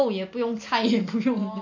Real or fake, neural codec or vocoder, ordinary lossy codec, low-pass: real; none; MP3, 48 kbps; 7.2 kHz